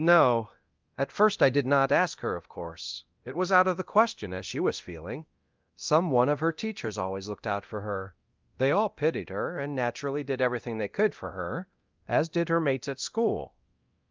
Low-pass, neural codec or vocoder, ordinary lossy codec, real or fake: 7.2 kHz; codec, 24 kHz, 0.9 kbps, DualCodec; Opus, 32 kbps; fake